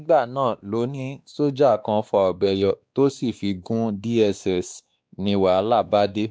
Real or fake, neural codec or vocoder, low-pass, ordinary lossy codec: fake; codec, 16 kHz, 2 kbps, X-Codec, WavLM features, trained on Multilingual LibriSpeech; none; none